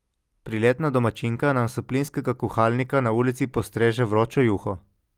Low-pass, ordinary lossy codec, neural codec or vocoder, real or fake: 19.8 kHz; Opus, 24 kbps; none; real